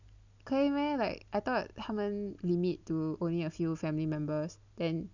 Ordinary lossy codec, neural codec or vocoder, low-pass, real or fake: none; none; 7.2 kHz; real